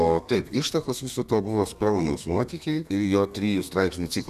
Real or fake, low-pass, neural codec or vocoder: fake; 14.4 kHz; codec, 32 kHz, 1.9 kbps, SNAC